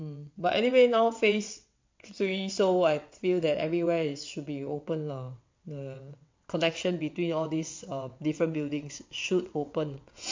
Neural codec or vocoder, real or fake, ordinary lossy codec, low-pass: vocoder, 22.05 kHz, 80 mel bands, WaveNeXt; fake; MP3, 48 kbps; 7.2 kHz